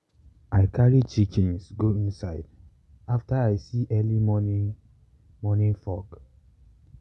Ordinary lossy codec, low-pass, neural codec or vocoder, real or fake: none; none; none; real